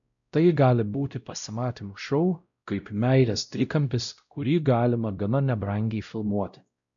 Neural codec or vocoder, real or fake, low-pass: codec, 16 kHz, 0.5 kbps, X-Codec, WavLM features, trained on Multilingual LibriSpeech; fake; 7.2 kHz